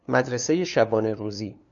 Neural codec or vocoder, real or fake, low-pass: codec, 16 kHz, 2 kbps, FunCodec, trained on LibriTTS, 25 frames a second; fake; 7.2 kHz